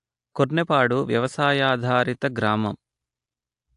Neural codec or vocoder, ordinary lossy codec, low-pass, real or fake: none; AAC, 64 kbps; 10.8 kHz; real